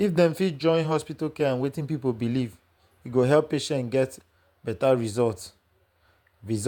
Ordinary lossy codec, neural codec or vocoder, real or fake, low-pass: none; vocoder, 48 kHz, 128 mel bands, Vocos; fake; 19.8 kHz